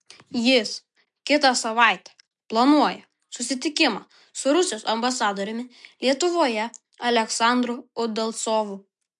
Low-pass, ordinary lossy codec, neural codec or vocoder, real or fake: 10.8 kHz; MP3, 64 kbps; none; real